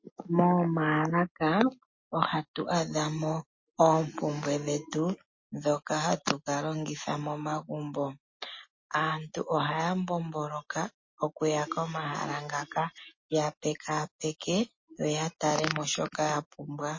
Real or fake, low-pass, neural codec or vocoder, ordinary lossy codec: real; 7.2 kHz; none; MP3, 32 kbps